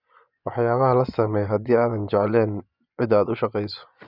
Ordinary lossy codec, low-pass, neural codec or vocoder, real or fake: none; 5.4 kHz; vocoder, 24 kHz, 100 mel bands, Vocos; fake